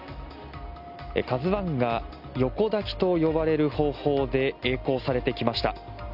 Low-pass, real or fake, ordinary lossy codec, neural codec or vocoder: 5.4 kHz; real; none; none